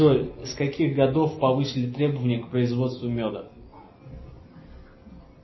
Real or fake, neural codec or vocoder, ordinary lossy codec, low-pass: real; none; MP3, 24 kbps; 7.2 kHz